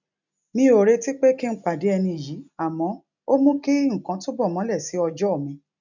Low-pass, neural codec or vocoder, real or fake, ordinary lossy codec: 7.2 kHz; none; real; none